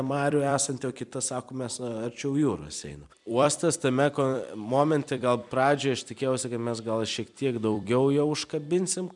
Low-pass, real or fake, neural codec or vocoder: 10.8 kHz; fake; vocoder, 44.1 kHz, 128 mel bands every 256 samples, BigVGAN v2